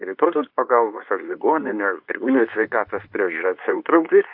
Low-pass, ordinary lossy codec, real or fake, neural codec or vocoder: 5.4 kHz; AAC, 48 kbps; fake; codec, 24 kHz, 0.9 kbps, WavTokenizer, medium speech release version 2